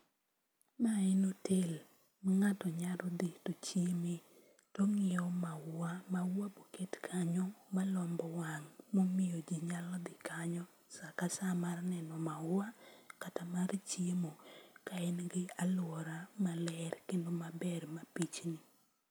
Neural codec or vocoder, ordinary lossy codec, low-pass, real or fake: none; none; none; real